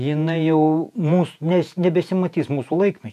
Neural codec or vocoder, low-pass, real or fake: vocoder, 48 kHz, 128 mel bands, Vocos; 14.4 kHz; fake